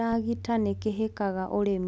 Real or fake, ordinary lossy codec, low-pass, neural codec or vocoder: real; none; none; none